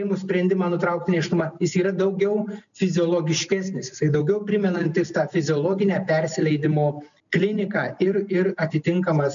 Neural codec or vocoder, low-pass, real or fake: none; 7.2 kHz; real